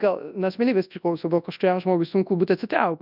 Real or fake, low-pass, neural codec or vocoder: fake; 5.4 kHz; codec, 24 kHz, 0.9 kbps, WavTokenizer, large speech release